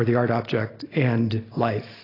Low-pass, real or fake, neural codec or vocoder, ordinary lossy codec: 5.4 kHz; real; none; AAC, 24 kbps